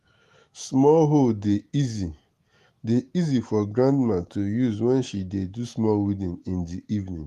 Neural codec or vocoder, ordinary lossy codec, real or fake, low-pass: autoencoder, 48 kHz, 128 numbers a frame, DAC-VAE, trained on Japanese speech; Opus, 24 kbps; fake; 14.4 kHz